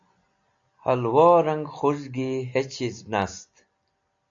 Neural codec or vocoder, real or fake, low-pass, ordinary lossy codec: none; real; 7.2 kHz; AAC, 64 kbps